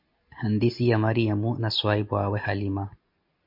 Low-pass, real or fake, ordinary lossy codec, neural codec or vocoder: 5.4 kHz; real; MP3, 32 kbps; none